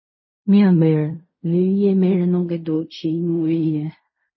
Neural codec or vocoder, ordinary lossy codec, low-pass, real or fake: codec, 16 kHz in and 24 kHz out, 0.4 kbps, LongCat-Audio-Codec, fine tuned four codebook decoder; MP3, 24 kbps; 7.2 kHz; fake